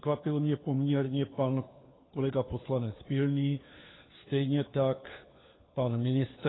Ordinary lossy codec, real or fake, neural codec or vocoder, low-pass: AAC, 16 kbps; fake; codec, 16 kHz, 4 kbps, FunCodec, trained on LibriTTS, 50 frames a second; 7.2 kHz